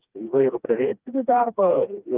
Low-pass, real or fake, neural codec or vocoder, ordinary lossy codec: 3.6 kHz; fake; codec, 16 kHz, 2 kbps, FreqCodec, smaller model; Opus, 32 kbps